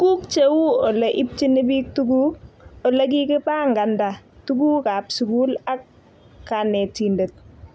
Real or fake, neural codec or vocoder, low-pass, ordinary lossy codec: real; none; none; none